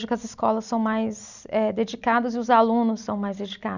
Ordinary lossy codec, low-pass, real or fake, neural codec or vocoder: none; 7.2 kHz; real; none